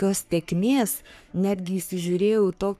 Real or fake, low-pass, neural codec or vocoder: fake; 14.4 kHz; codec, 44.1 kHz, 3.4 kbps, Pupu-Codec